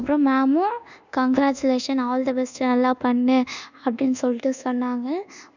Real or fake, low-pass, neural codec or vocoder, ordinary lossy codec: fake; 7.2 kHz; codec, 24 kHz, 1.2 kbps, DualCodec; none